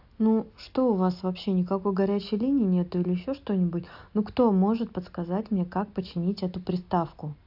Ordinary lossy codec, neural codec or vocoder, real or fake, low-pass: AAC, 48 kbps; none; real; 5.4 kHz